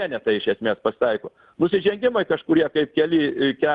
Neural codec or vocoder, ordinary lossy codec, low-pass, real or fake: none; Opus, 24 kbps; 10.8 kHz; real